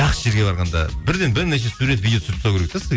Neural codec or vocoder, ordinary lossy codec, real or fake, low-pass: none; none; real; none